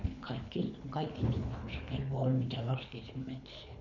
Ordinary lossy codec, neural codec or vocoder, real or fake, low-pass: none; codec, 24 kHz, 3 kbps, HILCodec; fake; 7.2 kHz